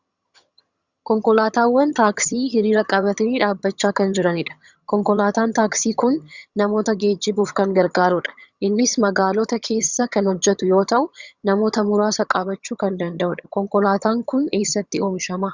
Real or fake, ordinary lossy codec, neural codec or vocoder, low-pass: fake; Opus, 64 kbps; vocoder, 22.05 kHz, 80 mel bands, HiFi-GAN; 7.2 kHz